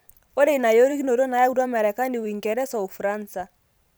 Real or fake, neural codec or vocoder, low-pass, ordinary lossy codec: fake; vocoder, 44.1 kHz, 128 mel bands every 512 samples, BigVGAN v2; none; none